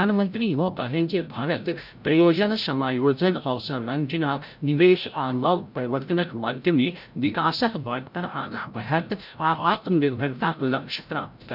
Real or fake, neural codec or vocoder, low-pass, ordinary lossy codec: fake; codec, 16 kHz, 0.5 kbps, FreqCodec, larger model; 5.4 kHz; none